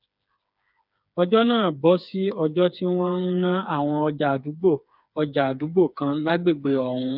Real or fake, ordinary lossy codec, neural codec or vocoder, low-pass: fake; none; codec, 16 kHz, 4 kbps, FreqCodec, smaller model; 5.4 kHz